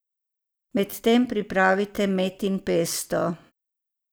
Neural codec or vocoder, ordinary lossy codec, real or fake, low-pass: vocoder, 44.1 kHz, 128 mel bands every 256 samples, BigVGAN v2; none; fake; none